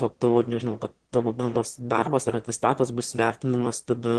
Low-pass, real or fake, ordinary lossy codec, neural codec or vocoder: 9.9 kHz; fake; Opus, 16 kbps; autoencoder, 22.05 kHz, a latent of 192 numbers a frame, VITS, trained on one speaker